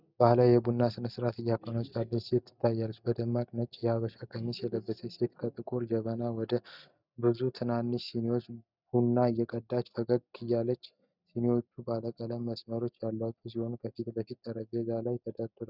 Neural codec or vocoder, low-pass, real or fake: none; 5.4 kHz; real